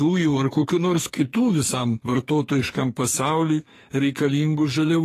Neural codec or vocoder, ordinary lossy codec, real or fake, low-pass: codec, 44.1 kHz, 2.6 kbps, SNAC; AAC, 48 kbps; fake; 14.4 kHz